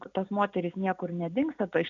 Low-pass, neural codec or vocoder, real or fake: 7.2 kHz; none; real